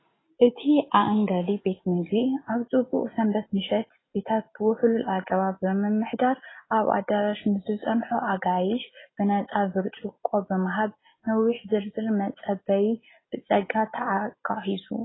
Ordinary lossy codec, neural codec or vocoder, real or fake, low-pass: AAC, 16 kbps; none; real; 7.2 kHz